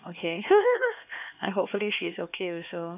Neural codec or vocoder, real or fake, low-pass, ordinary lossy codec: codec, 16 kHz, 2 kbps, X-Codec, HuBERT features, trained on LibriSpeech; fake; 3.6 kHz; none